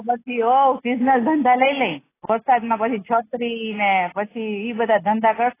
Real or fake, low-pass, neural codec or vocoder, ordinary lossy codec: real; 3.6 kHz; none; AAC, 16 kbps